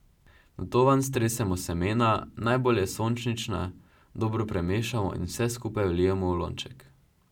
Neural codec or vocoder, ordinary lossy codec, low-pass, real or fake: none; none; 19.8 kHz; real